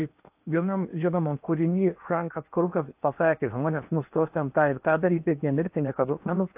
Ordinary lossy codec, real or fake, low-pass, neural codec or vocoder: AAC, 32 kbps; fake; 3.6 kHz; codec, 16 kHz in and 24 kHz out, 0.8 kbps, FocalCodec, streaming, 65536 codes